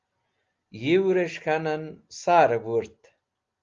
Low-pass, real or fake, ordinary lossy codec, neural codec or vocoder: 7.2 kHz; real; Opus, 32 kbps; none